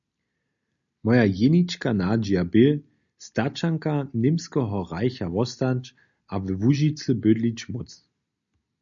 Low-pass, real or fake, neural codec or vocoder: 7.2 kHz; real; none